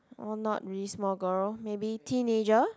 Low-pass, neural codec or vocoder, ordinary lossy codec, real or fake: none; none; none; real